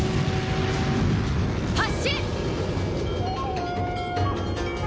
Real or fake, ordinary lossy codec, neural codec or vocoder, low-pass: real; none; none; none